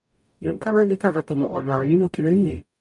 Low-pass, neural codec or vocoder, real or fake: 10.8 kHz; codec, 44.1 kHz, 0.9 kbps, DAC; fake